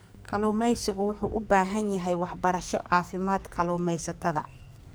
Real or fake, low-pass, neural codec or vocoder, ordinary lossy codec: fake; none; codec, 44.1 kHz, 2.6 kbps, SNAC; none